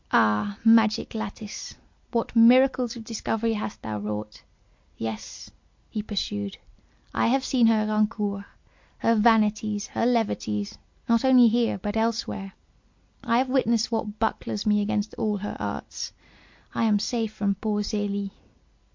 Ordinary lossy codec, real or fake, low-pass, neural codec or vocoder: MP3, 48 kbps; real; 7.2 kHz; none